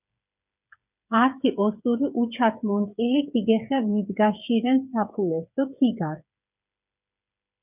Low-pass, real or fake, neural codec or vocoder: 3.6 kHz; fake; codec, 16 kHz, 8 kbps, FreqCodec, smaller model